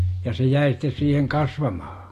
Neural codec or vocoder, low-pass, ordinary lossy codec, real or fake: none; 14.4 kHz; AAC, 48 kbps; real